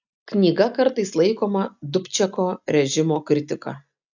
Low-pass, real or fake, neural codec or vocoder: 7.2 kHz; real; none